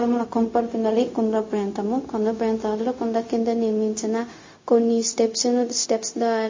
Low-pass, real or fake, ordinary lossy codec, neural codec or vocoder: 7.2 kHz; fake; MP3, 32 kbps; codec, 16 kHz, 0.4 kbps, LongCat-Audio-Codec